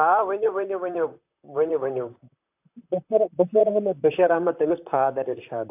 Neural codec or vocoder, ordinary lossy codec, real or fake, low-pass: vocoder, 44.1 kHz, 128 mel bands, Pupu-Vocoder; AAC, 32 kbps; fake; 3.6 kHz